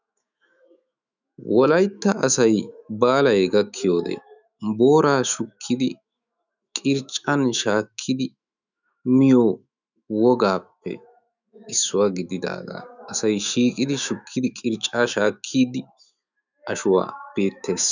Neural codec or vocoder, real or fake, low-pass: autoencoder, 48 kHz, 128 numbers a frame, DAC-VAE, trained on Japanese speech; fake; 7.2 kHz